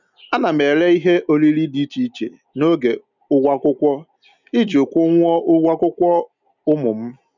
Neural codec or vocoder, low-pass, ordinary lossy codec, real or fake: none; 7.2 kHz; none; real